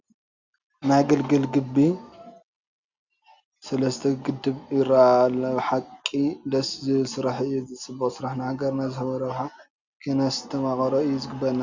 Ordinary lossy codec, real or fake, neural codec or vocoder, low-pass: Opus, 64 kbps; real; none; 7.2 kHz